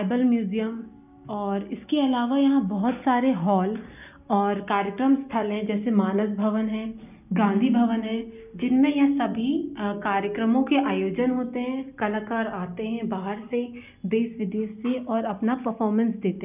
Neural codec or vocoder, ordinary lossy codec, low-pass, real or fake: none; none; 3.6 kHz; real